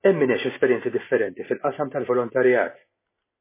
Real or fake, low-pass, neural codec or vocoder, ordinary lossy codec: real; 3.6 kHz; none; MP3, 16 kbps